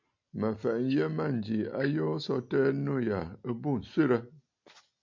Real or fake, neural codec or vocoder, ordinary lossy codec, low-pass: real; none; MP3, 48 kbps; 7.2 kHz